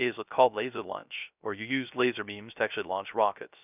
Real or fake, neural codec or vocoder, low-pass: fake; codec, 16 kHz, 0.3 kbps, FocalCodec; 3.6 kHz